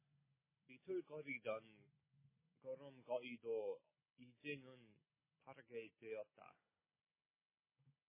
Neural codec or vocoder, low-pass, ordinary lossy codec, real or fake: none; 3.6 kHz; MP3, 16 kbps; real